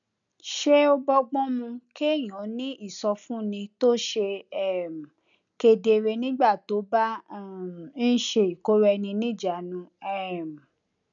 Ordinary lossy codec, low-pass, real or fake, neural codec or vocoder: none; 7.2 kHz; real; none